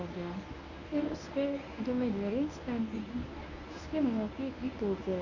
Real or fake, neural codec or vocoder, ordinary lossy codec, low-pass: fake; codec, 24 kHz, 0.9 kbps, WavTokenizer, medium speech release version 1; none; 7.2 kHz